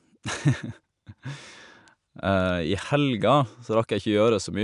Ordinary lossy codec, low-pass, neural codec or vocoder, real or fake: none; 10.8 kHz; none; real